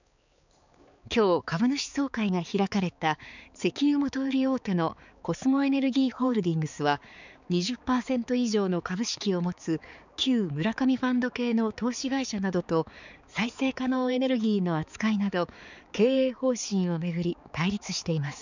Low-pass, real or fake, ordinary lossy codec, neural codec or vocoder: 7.2 kHz; fake; none; codec, 16 kHz, 4 kbps, X-Codec, HuBERT features, trained on balanced general audio